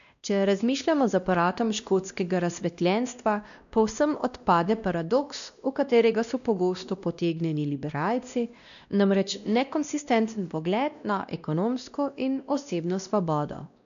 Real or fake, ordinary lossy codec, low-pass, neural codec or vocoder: fake; none; 7.2 kHz; codec, 16 kHz, 1 kbps, X-Codec, WavLM features, trained on Multilingual LibriSpeech